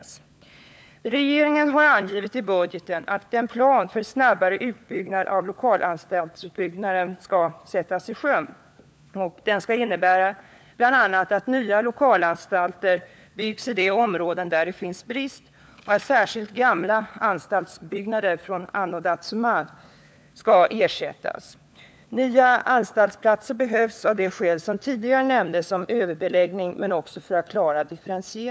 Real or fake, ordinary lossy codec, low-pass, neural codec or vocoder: fake; none; none; codec, 16 kHz, 4 kbps, FunCodec, trained on LibriTTS, 50 frames a second